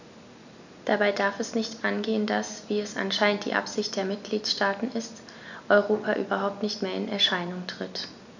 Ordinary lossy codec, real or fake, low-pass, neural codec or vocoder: none; real; 7.2 kHz; none